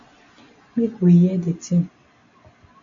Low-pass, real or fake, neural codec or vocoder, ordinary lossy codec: 7.2 kHz; real; none; AAC, 48 kbps